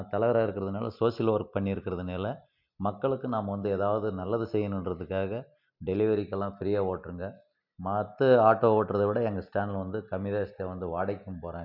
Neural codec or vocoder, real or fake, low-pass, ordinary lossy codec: none; real; 5.4 kHz; MP3, 48 kbps